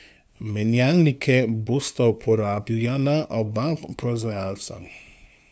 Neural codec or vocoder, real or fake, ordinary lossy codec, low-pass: codec, 16 kHz, 2 kbps, FunCodec, trained on LibriTTS, 25 frames a second; fake; none; none